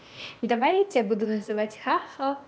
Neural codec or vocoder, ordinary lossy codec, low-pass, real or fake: codec, 16 kHz, 0.8 kbps, ZipCodec; none; none; fake